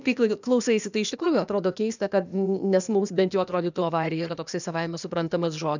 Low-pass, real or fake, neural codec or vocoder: 7.2 kHz; fake; codec, 16 kHz, 0.8 kbps, ZipCodec